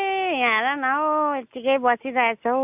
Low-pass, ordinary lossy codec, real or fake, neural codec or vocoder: 3.6 kHz; AAC, 32 kbps; real; none